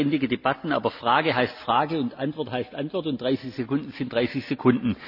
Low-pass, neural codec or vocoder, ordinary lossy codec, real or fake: 5.4 kHz; none; none; real